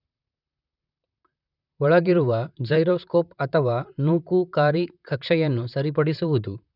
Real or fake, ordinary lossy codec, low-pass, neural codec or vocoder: fake; none; 5.4 kHz; vocoder, 44.1 kHz, 128 mel bands, Pupu-Vocoder